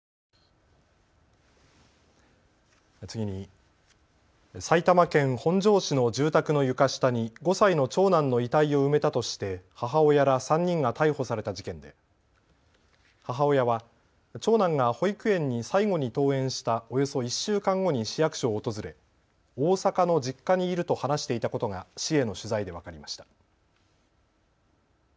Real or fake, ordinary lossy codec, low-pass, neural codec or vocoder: real; none; none; none